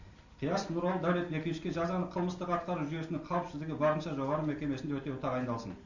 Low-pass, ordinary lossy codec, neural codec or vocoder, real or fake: 7.2 kHz; none; none; real